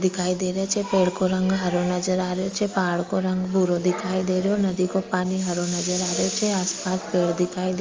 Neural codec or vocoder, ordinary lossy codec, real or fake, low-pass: none; Opus, 32 kbps; real; 7.2 kHz